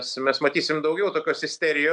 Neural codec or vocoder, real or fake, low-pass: none; real; 9.9 kHz